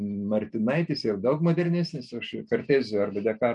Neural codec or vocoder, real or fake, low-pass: none; real; 10.8 kHz